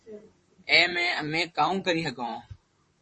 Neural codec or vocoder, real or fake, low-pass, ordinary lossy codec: vocoder, 44.1 kHz, 128 mel bands, Pupu-Vocoder; fake; 10.8 kHz; MP3, 32 kbps